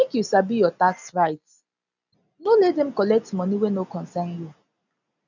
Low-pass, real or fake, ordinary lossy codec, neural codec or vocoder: 7.2 kHz; real; none; none